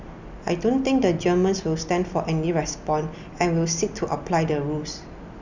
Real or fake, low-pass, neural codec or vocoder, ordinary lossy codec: real; 7.2 kHz; none; none